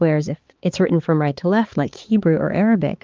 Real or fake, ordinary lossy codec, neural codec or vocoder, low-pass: fake; Opus, 32 kbps; codec, 16 kHz, 4 kbps, X-Codec, HuBERT features, trained on balanced general audio; 7.2 kHz